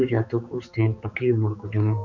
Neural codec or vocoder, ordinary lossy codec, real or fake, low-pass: codec, 16 kHz, 4 kbps, X-Codec, HuBERT features, trained on balanced general audio; MP3, 64 kbps; fake; 7.2 kHz